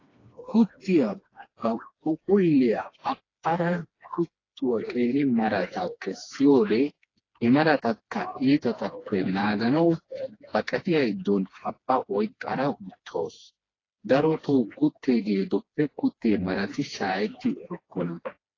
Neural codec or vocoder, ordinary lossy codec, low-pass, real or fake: codec, 16 kHz, 2 kbps, FreqCodec, smaller model; AAC, 32 kbps; 7.2 kHz; fake